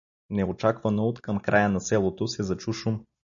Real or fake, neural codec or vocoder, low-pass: real; none; 7.2 kHz